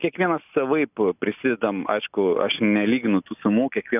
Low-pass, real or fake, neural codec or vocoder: 3.6 kHz; real; none